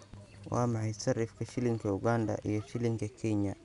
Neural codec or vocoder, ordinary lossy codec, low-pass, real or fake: none; none; 10.8 kHz; real